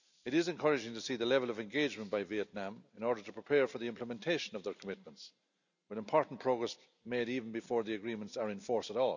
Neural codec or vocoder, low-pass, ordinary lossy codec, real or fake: none; 7.2 kHz; none; real